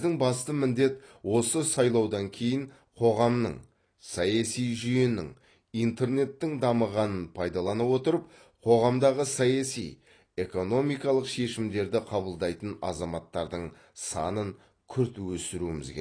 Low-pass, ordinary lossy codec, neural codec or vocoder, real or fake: 9.9 kHz; AAC, 32 kbps; none; real